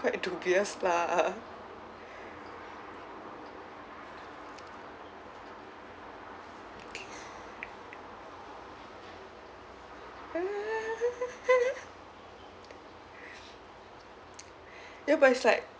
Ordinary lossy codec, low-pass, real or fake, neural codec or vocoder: none; none; real; none